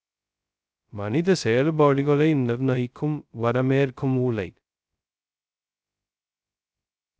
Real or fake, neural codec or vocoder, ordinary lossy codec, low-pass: fake; codec, 16 kHz, 0.2 kbps, FocalCodec; none; none